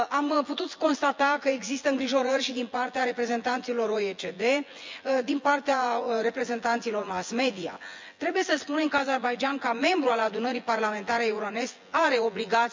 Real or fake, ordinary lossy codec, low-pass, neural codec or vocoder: fake; MP3, 64 kbps; 7.2 kHz; vocoder, 24 kHz, 100 mel bands, Vocos